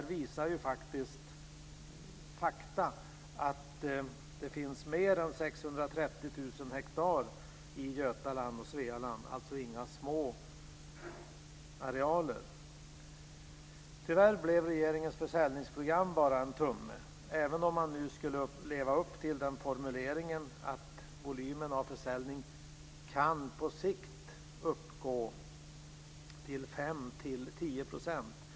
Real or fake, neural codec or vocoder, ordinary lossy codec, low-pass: real; none; none; none